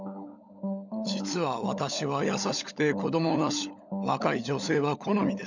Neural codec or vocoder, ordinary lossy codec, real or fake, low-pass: codec, 16 kHz, 16 kbps, FunCodec, trained on LibriTTS, 50 frames a second; none; fake; 7.2 kHz